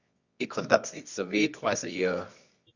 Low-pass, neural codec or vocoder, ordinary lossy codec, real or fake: 7.2 kHz; codec, 24 kHz, 0.9 kbps, WavTokenizer, medium music audio release; Opus, 64 kbps; fake